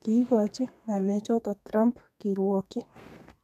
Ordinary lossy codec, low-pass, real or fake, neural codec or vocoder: none; 14.4 kHz; fake; codec, 32 kHz, 1.9 kbps, SNAC